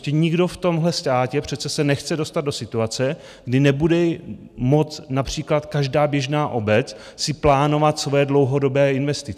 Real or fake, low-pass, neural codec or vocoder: real; 14.4 kHz; none